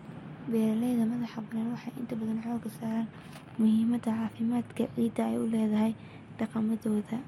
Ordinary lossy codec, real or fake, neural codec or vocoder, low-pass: MP3, 64 kbps; real; none; 19.8 kHz